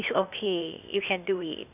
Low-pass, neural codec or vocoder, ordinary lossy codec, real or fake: 3.6 kHz; codec, 16 kHz, 0.8 kbps, ZipCodec; none; fake